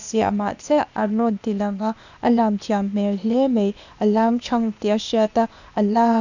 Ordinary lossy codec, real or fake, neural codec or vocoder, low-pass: none; fake; codec, 16 kHz, 0.8 kbps, ZipCodec; 7.2 kHz